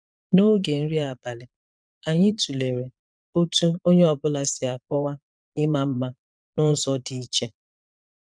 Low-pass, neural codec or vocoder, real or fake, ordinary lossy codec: 9.9 kHz; vocoder, 22.05 kHz, 80 mel bands, Vocos; fake; none